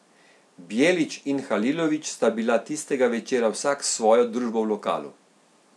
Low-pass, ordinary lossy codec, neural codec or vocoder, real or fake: none; none; none; real